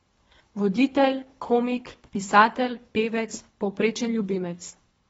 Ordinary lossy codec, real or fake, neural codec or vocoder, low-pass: AAC, 24 kbps; fake; codec, 24 kHz, 3 kbps, HILCodec; 10.8 kHz